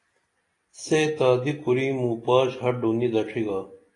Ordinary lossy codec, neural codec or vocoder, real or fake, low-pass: AAC, 32 kbps; none; real; 10.8 kHz